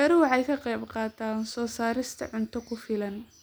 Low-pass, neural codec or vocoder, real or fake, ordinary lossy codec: none; none; real; none